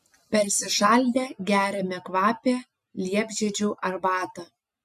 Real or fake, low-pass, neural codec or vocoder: real; 14.4 kHz; none